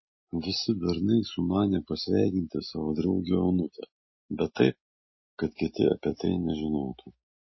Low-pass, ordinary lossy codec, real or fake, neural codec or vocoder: 7.2 kHz; MP3, 24 kbps; real; none